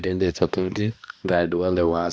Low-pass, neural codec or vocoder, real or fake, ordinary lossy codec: none; codec, 16 kHz, 1 kbps, X-Codec, HuBERT features, trained on balanced general audio; fake; none